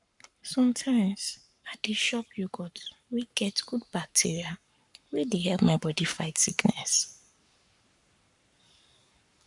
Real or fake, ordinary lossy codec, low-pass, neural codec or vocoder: fake; none; 10.8 kHz; codec, 44.1 kHz, 7.8 kbps, Pupu-Codec